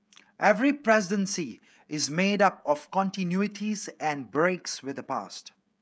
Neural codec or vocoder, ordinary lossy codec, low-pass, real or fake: codec, 16 kHz, 16 kbps, FreqCodec, smaller model; none; none; fake